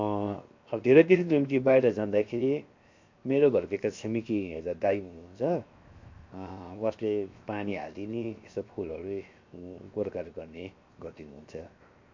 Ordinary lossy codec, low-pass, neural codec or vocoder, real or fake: MP3, 48 kbps; 7.2 kHz; codec, 16 kHz, 0.7 kbps, FocalCodec; fake